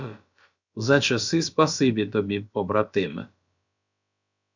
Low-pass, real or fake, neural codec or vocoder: 7.2 kHz; fake; codec, 16 kHz, about 1 kbps, DyCAST, with the encoder's durations